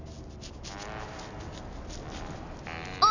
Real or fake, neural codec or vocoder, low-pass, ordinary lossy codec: real; none; 7.2 kHz; none